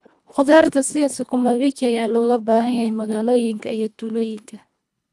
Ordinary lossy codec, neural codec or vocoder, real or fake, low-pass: none; codec, 24 kHz, 1.5 kbps, HILCodec; fake; none